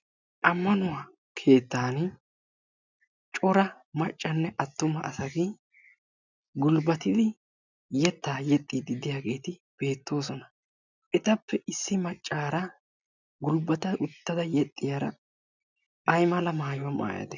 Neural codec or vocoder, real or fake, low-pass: none; real; 7.2 kHz